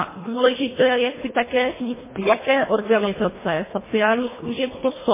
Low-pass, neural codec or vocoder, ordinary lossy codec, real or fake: 3.6 kHz; codec, 24 kHz, 1.5 kbps, HILCodec; MP3, 16 kbps; fake